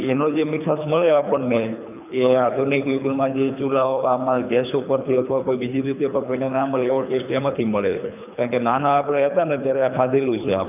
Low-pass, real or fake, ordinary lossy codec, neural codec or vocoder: 3.6 kHz; fake; none; codec, 24 kHz, 3 kbps, HILCodec